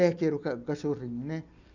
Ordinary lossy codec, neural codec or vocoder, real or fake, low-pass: none; none; real; 7.2 kHz